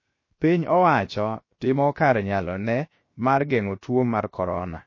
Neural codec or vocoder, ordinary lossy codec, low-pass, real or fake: codec, 16 kHz, 0.7 kbps, FocalCodec; MP3, 32 kbps; 7.2 kHz; fake